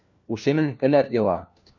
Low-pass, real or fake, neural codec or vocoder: 7.2 kHz; fake; codec, 16 kHz, 1 kbps, FunCodec, trained on LibriTTS, 50 frames a second